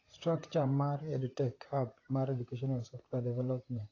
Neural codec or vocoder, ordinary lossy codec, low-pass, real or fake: vocoder, 44.1 kHz, 128 mel bands, Pupu-Vocoder; AAC, 32 kbps; 7.2 kHz; fake